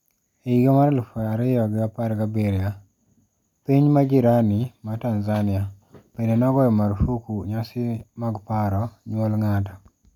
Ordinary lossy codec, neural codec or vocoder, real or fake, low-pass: none; none; real; 19.8 kHz